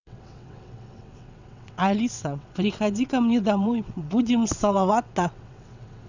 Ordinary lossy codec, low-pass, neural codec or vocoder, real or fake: none; 7.2 kHz; vocoder, 22.05 kHz, 80 mel bands, WaveNeXt; fake